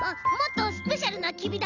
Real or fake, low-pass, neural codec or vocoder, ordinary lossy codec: real; 7.2 kHz; none; none